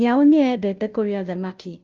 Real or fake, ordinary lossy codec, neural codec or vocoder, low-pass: fake; Opus, 32 kbps; codec, 16 kHz, 0.5 kbps, FunCodec, trained on Chinese and English, 25 frames a second; 7.2 kHz